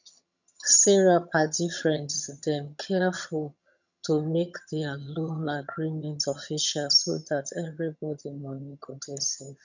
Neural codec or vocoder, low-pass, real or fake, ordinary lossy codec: vocoder, 22.05 kHz, 80 mel bands, HiFi-GAN; 7.2 kHz; fake; none